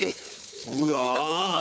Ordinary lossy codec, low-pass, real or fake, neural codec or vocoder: none; none; fake; codec, 16 kHz, 4 kbps, FunCodec, trained on Chinese and English, 50 frames a second